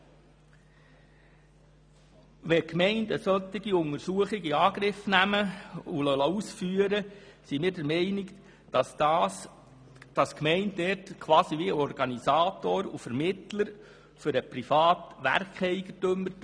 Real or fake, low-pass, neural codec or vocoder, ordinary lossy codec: real; 9.9 kHz; none; none